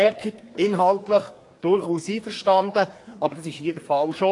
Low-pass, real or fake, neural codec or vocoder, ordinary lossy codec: 10.8 kHz; fake; codec, 24 kHz, 1 kbps, SNAC; AAC, 48 kbps